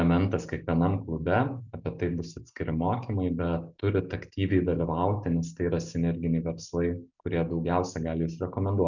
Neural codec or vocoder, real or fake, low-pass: none; real; 7.2 kHz